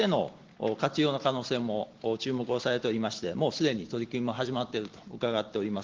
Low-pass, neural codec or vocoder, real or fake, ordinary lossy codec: 7.2 kHz; none; real; Opus, 16 kbps